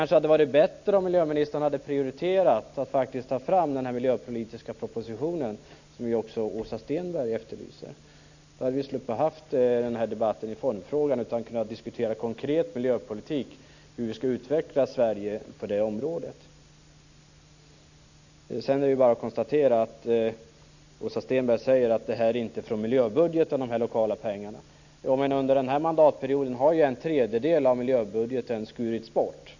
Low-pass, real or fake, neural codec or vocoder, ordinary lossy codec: 7.2 kHz; real; none; none